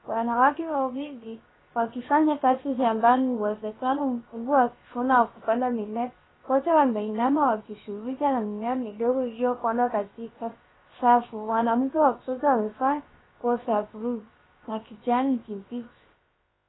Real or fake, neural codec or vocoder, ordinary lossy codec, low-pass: fake; codec, 16 kHz, about 1 kbps, DyCAST, with the encoder's durations; AAC, 16 kbps; 7.2 kHz